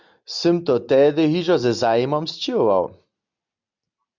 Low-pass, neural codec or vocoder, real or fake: 7.2 kHz; none; real